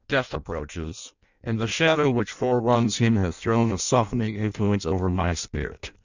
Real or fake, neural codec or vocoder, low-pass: fake; codec, 16 kHz in and 24 kHz out, 0.6 kbps, FireRedTTS-2 codec; 7.2 kHz